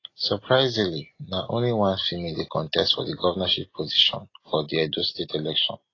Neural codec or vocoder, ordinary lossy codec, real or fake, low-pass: none; AAC, 32 kbps; real; 7.2 kHz